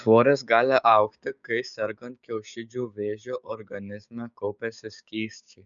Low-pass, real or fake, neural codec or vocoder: 7.2 kHz; fake; codec, 16 kHz, 6 kbps, DAC